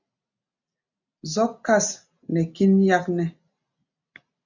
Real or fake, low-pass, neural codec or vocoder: real; 7.2 kHz; none